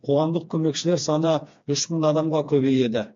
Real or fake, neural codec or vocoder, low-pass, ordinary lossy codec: fake; codec, 16 kHz, 2 kbps, FreqCodec, smaller model; 7.2 kHz; MP3, 48 kbps